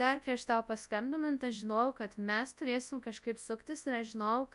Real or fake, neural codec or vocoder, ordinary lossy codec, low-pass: fake; codec, 24 kHz, 0.9 kbps, WavTokenizer, large speech release; AAC, 96 kbps; 10.8 kHz